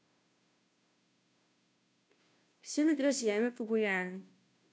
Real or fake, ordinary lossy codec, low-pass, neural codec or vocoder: fake; none; none; codec, 16 kHz, 0.5 kbps, FunCodec, trained on Chinese and English, 25 frames a second